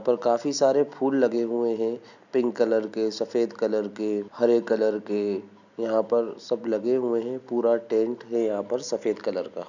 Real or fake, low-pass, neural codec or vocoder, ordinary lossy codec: real; 7.2 kHz; none; none